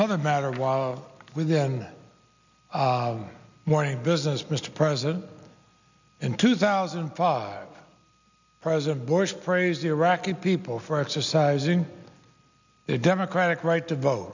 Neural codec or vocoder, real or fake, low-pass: none; real; 7.2 kHz